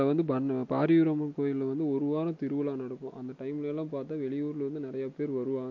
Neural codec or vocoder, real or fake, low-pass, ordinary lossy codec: none; real; 7.2 kHz; MP3, 48 kbps